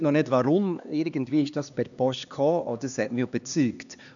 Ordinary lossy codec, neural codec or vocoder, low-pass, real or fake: none; codec, 16 kHz, 2 kbps, X-Codec, HuBERT features, trained on LibriSpeech; 7.2 kHz; fake